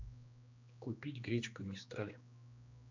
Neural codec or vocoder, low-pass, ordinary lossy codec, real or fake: codec, 16 kHz, 2 kbps, X-Codec, HuBERT features, trained on general audio; 7.2 kHz; MP3, 64 kbps; fake